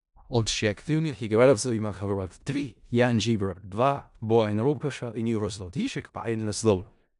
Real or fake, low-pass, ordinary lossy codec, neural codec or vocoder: fake; 10.8 kHz; none; codec, 16 kHz in and 24 kHz out, 0.4 kbps, LongCat-Audio-Codec, four codebook decoder